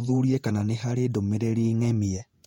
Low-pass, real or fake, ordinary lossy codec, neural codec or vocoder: 19.8 kHz; fake; MP3, 64 kbps; vocoder, 48 kHz, 128 mel bands, Vocos